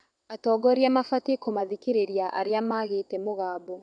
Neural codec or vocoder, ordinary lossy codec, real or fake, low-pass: vocoder, 22.05 kHz, 80 mel bands, WaveNeXt; none; fake; 9.9 kHz